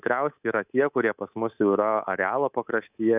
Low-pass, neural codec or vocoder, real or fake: 3.6 kHz; codec, 24 kHz, 3.1 kbps, DualCodec; fake